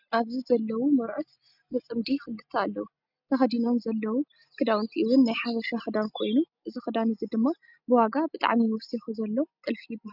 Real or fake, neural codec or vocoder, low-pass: real; none; 5.4 kHz